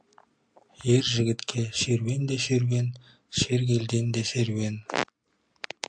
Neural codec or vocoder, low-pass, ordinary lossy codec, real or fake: none; 9.9 kHz; AAC, 64 kbps; real